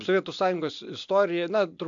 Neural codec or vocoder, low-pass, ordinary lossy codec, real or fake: none; 7.2 kHz; AAC, 64 kbps; real